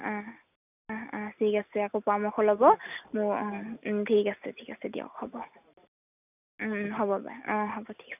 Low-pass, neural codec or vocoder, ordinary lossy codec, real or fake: 3.6 kHz; none; none; real